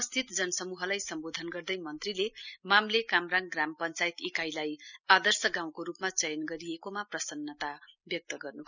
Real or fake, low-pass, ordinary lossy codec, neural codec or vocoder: real; 7.2 kHz; none; none